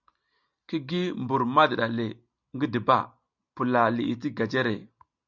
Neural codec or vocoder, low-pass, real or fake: none; 7.2 kHz; real